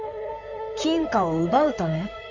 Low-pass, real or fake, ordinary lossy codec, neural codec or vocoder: 7.2 kHz; fake; none; codec, 16 kHz in and 24 kHz out, 2.2 kbps, FireRedTTS-2 codec